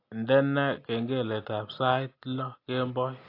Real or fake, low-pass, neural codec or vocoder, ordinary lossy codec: real; 5.4 kHz; none; AAC, 32 kbps